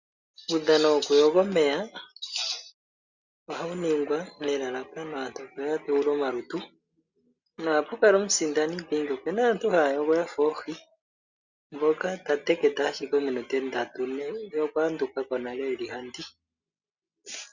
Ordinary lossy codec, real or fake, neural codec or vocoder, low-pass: Opus, 64 kbps; real; none; 7.2 kHz